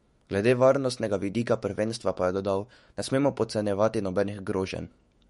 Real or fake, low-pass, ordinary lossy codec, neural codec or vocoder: fake; 19.8 kHz; MP3, 48 kbps; autoencoder, 48 kHz, 128 numbers a frame, DAC-VAE, trained on Japanese speech